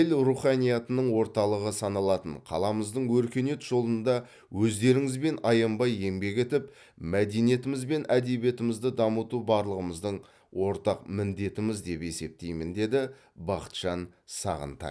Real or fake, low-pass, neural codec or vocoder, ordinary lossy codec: real; none; none; none